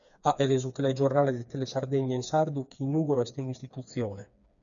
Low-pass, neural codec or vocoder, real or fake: 7.2 kHz; codec, 16 kHz, 4 kbps, FreqCodec, smaller model; fake